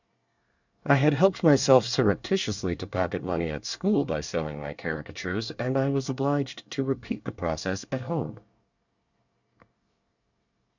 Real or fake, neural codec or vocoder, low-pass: fake; codec, 24 kHz, 1 kbps, SNAC; 7.2 kHz